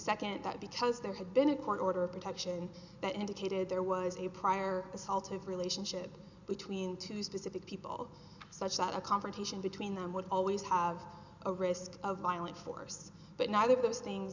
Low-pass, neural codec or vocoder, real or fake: 7.2 kHz; none; real